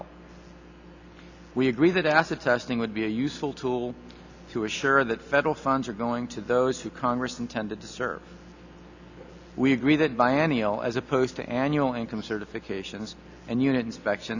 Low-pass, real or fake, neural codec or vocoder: 7.2 kHz; real; none